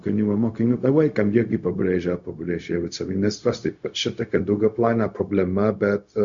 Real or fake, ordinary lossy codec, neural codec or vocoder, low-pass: fake; Opus, 64 kbps; codec, 16 kHz, 0.4 kbps, LongCat-Audio-Codec; 7.2 kHz